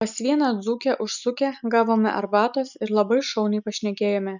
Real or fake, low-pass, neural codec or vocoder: real; 7.2 kHz; none